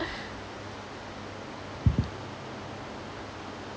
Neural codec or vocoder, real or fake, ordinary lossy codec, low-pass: none; real; none; none